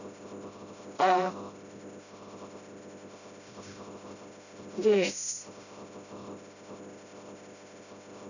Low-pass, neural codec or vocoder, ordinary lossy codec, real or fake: 7.2 kHz; codec, 16 kHz, 0.5 kbps, FreqCodec, smaller model; none; fake